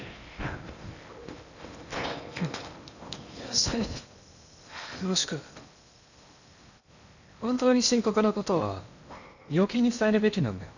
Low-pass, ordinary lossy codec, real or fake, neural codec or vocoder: 7.2 kHz; none; fake; codec, 16 kHz in and 24 kHz out, 0.8 kbps, FocalCodec, streaming, 65536 codes